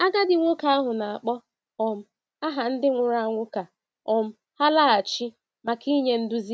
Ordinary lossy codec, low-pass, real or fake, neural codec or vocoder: none; none; real; none